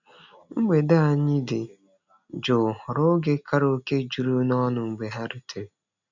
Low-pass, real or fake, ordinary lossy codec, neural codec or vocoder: 7.2 kHz; real; none; none